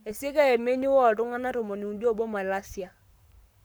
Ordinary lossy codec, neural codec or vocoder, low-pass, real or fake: none; codec, 44.1 kHz, 7.8 kbps, Pupu-Codec; none; fake